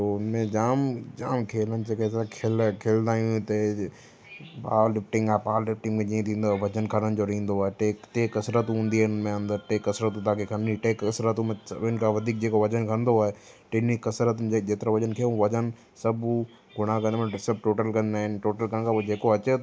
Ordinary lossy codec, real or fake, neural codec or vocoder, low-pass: none; real; none; none